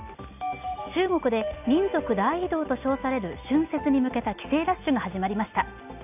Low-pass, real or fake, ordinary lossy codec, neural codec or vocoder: 3.6 kHz; real; none; none